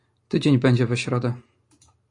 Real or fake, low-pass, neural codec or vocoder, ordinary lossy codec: real; 10.8 kHz; none; AAC, 64 kbps